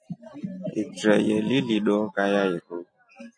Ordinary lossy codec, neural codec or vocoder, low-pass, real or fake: AAC, 48 kbps; none; 9.9 kHz; real